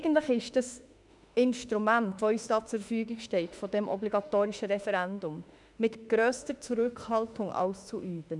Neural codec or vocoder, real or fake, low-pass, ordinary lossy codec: autoencoder, 48 kHz, 32 numbers a frame, DAC-VAE, trained on Japanese speech; fake; 10.8 kHz; none